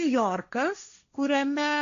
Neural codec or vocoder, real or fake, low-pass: codec, 16 kHz, 1.1 kbps, Voila-Tokenizer; fake; 7.2 kHz